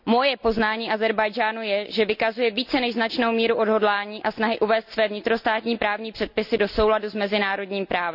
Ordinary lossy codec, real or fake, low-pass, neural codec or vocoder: none; real; 5.4 kHz; none